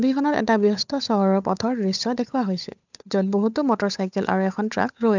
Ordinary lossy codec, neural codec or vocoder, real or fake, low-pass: none; codec, 16 kHz, 8 kbps, FunCodec, trained on Chinese and English, 25 frames a second; fake; 7.2 kHz